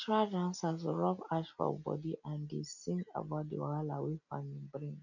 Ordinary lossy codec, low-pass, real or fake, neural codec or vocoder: none; 7.2 kHz; real; none